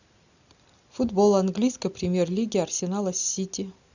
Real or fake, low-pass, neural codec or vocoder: real; 7.2 kHz; none